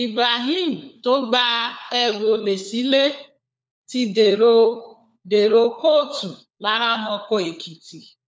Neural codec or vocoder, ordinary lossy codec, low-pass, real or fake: codec, 16 kHz, 4 kbps, FunCodec, trained on LibriTTS, 50 frames a second; none; none; fake